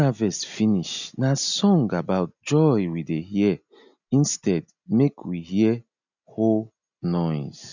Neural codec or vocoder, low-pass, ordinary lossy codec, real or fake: none; 7.2 kHz; none; real